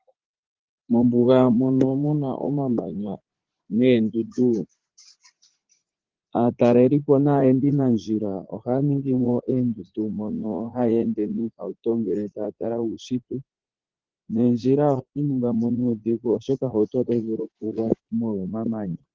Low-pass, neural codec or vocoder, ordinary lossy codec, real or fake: 7.2 kHz; vocoder, 44.1 kHz, 80 mel bands, Vocos; Opus, 16 kbps; fake